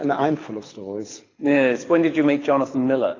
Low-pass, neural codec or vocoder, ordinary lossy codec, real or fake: 7.2 kHz; codec, 24 kHz, 6 kbps, HILCodec; AAC, 32 kbps; fake